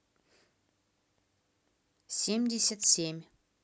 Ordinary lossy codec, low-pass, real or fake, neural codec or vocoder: none; none; real; none